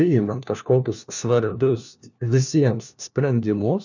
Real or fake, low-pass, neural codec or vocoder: fake; 7.2 kHz; codec, 16 kHz, 1 kbps, FunCodec, trained on LibriTTS, 50 frames a second